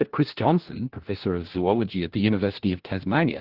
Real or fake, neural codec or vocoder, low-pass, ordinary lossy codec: fake; codec, 16 kHz, 1 kbps, FunCodec, trained on LibriTTS, 50 frames a second; 5.4 kHz; Opus, 16 kbps